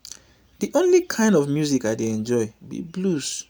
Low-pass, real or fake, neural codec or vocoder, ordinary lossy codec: none; real; none; none